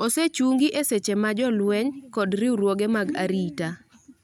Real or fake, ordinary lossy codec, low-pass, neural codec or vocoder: real; none; 19.8 kHz; none